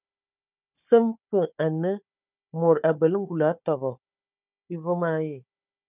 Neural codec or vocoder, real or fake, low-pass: codec, 16 kHz, 4 kbps, FunCodec, trained on Chinese and English, 50 frames a second; fake; 3.6 kHz